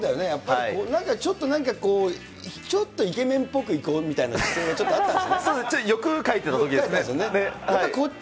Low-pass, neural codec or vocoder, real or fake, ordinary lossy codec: none; none; real; none